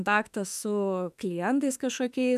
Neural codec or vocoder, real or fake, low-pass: autoencoder, 48 kHz, 32 numbers a frame, DAC-VAE, trained on Japanese speech; fake; 14.4 kHz